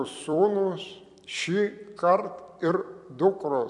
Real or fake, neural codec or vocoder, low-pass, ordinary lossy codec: fake; vocoder, 44.1 kHz, 128 mel bands every 256 samples, BigVGAN v2; 10.8 kHz; MP3, 64 kbps